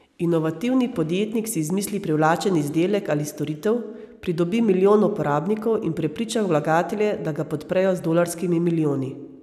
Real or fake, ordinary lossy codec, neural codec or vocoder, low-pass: real; none; none; 14.4 kHz